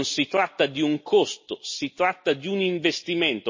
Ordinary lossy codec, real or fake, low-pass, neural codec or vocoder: MP3, 32 kbps; real; 7.2 kHz; none